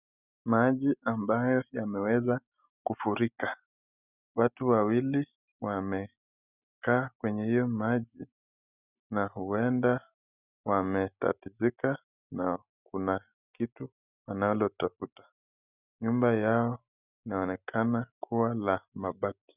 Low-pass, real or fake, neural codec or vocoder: 3.6 kHz; real; none